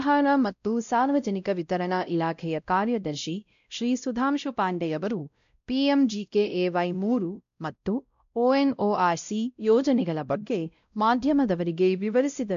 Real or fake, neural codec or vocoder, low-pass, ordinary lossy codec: fake; codec, 16 kHz, 0.5 kbps, X-Codec, WavLM features, trained on Multilingual LibriSpeech; 7.2 kHz; MP3, 64 kbps